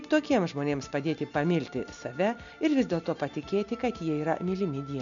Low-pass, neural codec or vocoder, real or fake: 7.2 kHz; none; real